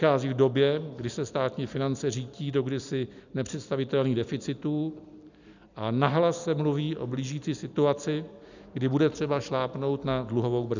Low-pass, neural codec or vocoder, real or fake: 7.2 kHz; none; real